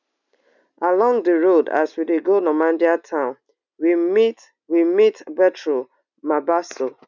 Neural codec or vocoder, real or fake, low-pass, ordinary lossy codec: none; real; 7.2 kHz; none